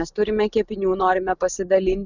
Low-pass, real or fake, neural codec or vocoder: 7.2 kHz; real; none